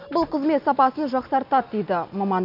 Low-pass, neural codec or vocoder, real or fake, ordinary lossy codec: 5.4 kHz; none; real; none